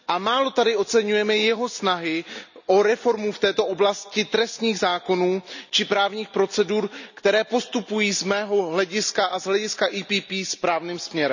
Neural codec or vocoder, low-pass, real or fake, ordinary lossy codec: none; 7.2 kHz; real; none